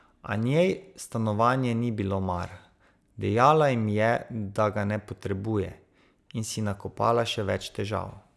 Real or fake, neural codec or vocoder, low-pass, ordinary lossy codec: real; none; none; none